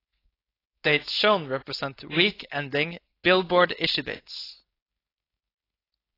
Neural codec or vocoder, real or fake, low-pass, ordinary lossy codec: codec, 16 kHz, 4.8 kbps, FACodec; fake; 5.4 kHz; AAC, 24 kbps